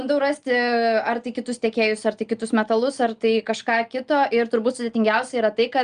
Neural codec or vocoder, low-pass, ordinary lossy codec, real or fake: none; 9.9 kHz; Opus, 32 kbps; real